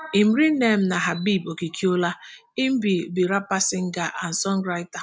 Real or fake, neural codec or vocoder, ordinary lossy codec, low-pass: real; none; none; none